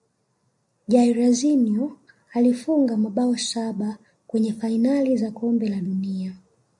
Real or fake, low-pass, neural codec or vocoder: real; 10.8 kHz; none